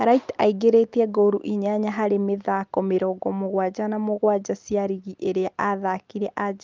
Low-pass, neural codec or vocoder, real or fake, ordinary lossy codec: 7.2 kHz; none; real; Opus, 32 kbps